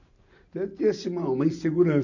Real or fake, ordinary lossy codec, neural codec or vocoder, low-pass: real; none; none; 7.2 kHz